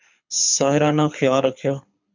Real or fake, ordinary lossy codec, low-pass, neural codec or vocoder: fake; MP3, 64 kbps; 7.2 kHz; codec, 24 kHz, 6 kbps, HILCodec